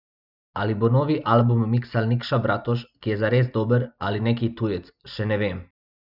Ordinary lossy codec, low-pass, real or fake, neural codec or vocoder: none; 5.4 kHz; real; none